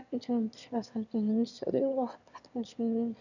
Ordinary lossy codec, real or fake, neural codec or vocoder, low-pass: none; fake; autoencoder, 22.05 kHz, a latent of 192 numbers a frame, VITS, trained on one speaker; 7.2 kHz